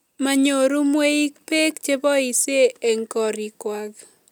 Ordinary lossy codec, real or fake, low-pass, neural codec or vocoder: none; real; none; none